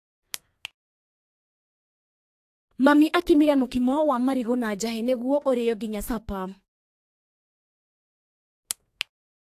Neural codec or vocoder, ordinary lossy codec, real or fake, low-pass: codec, 32 kHz, 1.9 kbps, SNAC; AAC, 64 kbps; fake; 14.4 kHz